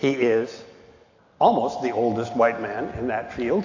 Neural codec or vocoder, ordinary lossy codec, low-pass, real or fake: none; AAC, 48 kbps; 7.2 kHz; real